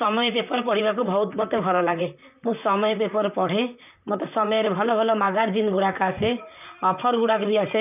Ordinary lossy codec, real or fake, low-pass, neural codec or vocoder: none; fake; 3.6 kHz; vocoder, 44.1 kHz, 128 mel bands, Pupu-Vocoder